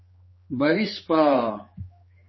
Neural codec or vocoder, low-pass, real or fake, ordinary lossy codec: codec, 16 kHz, 4 kbps, X-Codec, HuBERT features, trained on general audio; 7.2 kHz; fake; MP3, 24 kbps